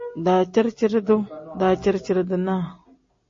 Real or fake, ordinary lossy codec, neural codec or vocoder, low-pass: real; MP3, 32 kbps; none; 7.2 kHz